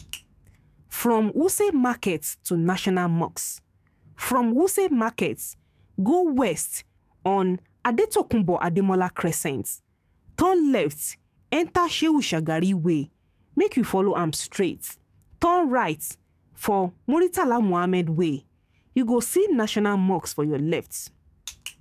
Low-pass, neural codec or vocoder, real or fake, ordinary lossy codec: 14.4 kHz; codec, 44.1 kHz, 7.8 kbps, DAC; fake; none